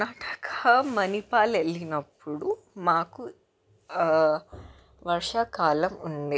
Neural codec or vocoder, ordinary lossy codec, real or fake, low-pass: none; none; real; none